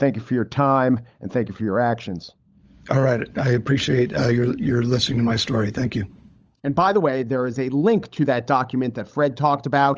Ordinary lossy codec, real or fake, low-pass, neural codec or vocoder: Opus, 24 kbps; fake; 7.2 kHz; codec, 16 kHz, 16 kbps, FunCodec, trained on LibriTTS, 50 frames a second